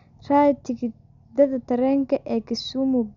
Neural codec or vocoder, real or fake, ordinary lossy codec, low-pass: none; real; MP3, 96 kbps; 7.2 kHz